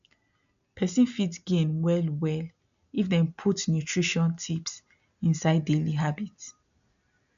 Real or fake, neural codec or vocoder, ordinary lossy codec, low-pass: real; none; none; 7.2 kHz